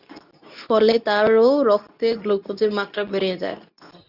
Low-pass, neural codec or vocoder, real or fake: 5.4 kHz; codec, 24 kHz, 0.9 kbps, WavTokenizer, medium speech release version 2; fake